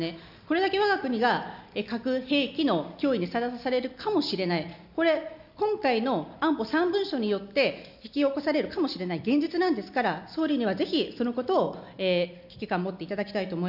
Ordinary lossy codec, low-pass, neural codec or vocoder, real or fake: none; 5.4 kHz; none; real